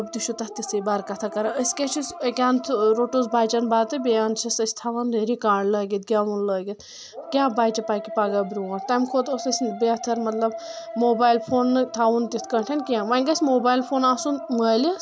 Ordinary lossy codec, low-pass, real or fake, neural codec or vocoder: none; none; real; none